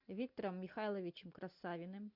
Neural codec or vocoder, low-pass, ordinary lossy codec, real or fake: none; 5.4 kHz; MP3, 48 kbps; real